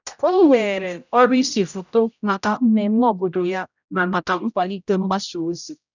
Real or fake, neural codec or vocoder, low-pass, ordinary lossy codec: fake; codec, 16 kHz, 0.5 kbps, X-Codec, HuBERT features, trained on general audio; 7.2 kHz; none